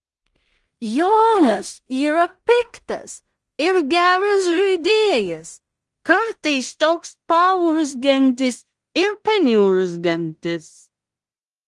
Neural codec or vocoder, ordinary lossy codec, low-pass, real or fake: codec, 16 kHz in and 24 kHz out, 0.4 kbps, LongCat-Audio-Codec, two codebook decoder; Opus, 24 kbps; 10.8 kHz; fake